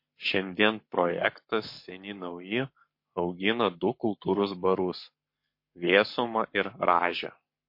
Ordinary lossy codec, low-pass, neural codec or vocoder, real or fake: MP3, 32 kbps; 5.4 kHz; codec, 44.1 kHz, 7.8 kbps, Pupu-Codec; fake